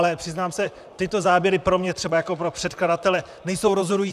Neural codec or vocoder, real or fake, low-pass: vocoder, 44.1 kHz, 128 mel bands, Pupu-Vocoder; fake; 14.4 kHz